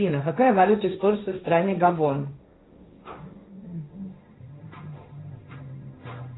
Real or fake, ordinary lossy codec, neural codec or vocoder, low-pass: fake; AAC, 16 kbps; codec, 16 kHz, 1.1 kbps, Voila-Tokenizer; 7.2 kHz